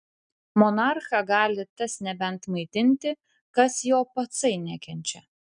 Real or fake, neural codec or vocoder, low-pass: real; none; 9.9 kHz